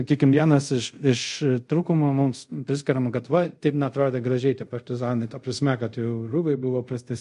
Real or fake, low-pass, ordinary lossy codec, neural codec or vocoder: fake; 10.8 kHz; MP3, 48 kbps; codec, 24 kHz, 0.5 kbps, DualCodec